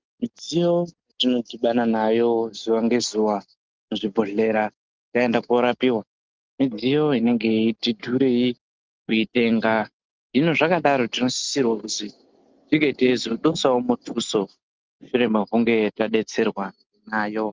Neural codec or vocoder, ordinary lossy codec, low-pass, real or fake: none; Opus, 32 kbps; 7.2 kHz; real